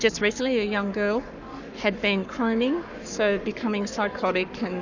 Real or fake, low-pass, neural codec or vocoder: fake; 7.2 kHz; codec, 44.1 kHz, 7.8 kbps, Pupu-Codec